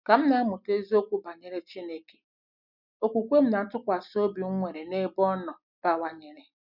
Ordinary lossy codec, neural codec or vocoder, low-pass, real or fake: none; none; 5.4 kHz; real